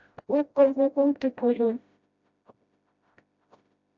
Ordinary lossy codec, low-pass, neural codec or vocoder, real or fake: AAC, 64 kbps; 7.2 kHz; codec, 16 kHz, 0.5 kbps, FreqCodec, smaller model; fake